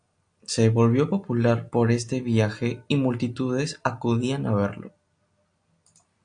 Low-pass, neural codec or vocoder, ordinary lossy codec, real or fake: 9.9 kHz; none; AAC, 64 kbps; real